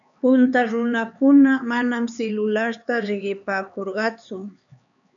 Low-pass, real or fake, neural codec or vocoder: 7.2 kHz; fake; codec, 16 kHz, 4 kbps, X-Codec, HuBERT features, trained on LibriSpeech